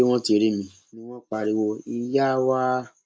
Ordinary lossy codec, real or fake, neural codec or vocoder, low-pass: none; real; none; none